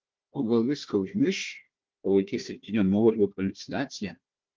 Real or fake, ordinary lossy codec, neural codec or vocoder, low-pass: fake; Opus, 32 kbps; codec, 16 kHz, 1 kbps, FunCodec, trained on Chinese and English, 50 frames a second; 7.2 kHz